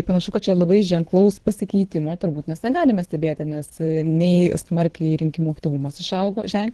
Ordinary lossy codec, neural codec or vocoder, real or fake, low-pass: Opus, 16 kbps; codec, 24 kHz, 3 kbps, HILCodec; fake; 10.8 kHz